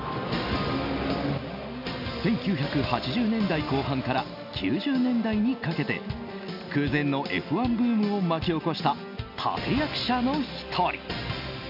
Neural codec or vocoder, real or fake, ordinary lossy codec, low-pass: none; real; none; 5.4 kHz